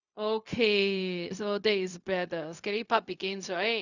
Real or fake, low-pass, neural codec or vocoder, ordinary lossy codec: fake; 7.2 kHz; codec, 16 kHz, 0.4 kbps, LongCat-Audio-Codec; none